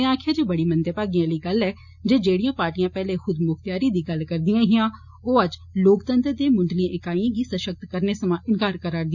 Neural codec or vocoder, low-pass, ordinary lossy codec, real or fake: none; 7.2 kHz; none; real